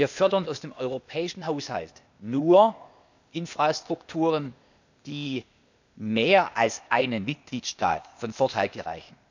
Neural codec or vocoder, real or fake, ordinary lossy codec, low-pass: codec, 16 kHz, 0.8 kbps, ZipCodec; fake; none; 7.2 kHz